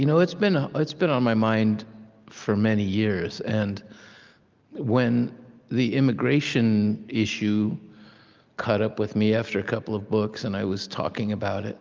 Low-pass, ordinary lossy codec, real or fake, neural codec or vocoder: 7.2 kHz; Opus, 24 kbps; real; none